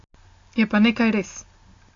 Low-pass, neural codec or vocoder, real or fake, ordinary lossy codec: 7.2 kHz; none; real; AAC, 48 kbps